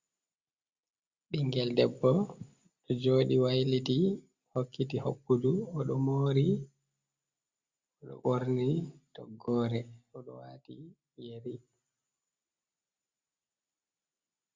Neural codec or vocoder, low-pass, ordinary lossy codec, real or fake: none; 7.2 kHz; Opus, 64 kbps; real